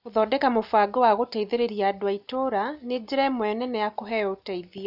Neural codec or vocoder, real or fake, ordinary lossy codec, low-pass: none; real; none; 5.4 kHz